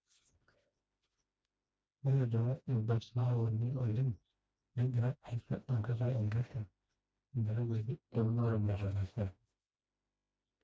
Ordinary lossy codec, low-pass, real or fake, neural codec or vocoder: none; none; fake; codec, 16 kHz, 1 kbps, FreqCodec, smaller model